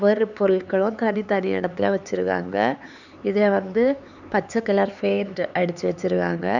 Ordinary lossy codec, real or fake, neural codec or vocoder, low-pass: none; fake; codec, 16 kHz, 4 kbps, X-Codec, HuBERT features, trained on LibriSpeech; 7.2 kHz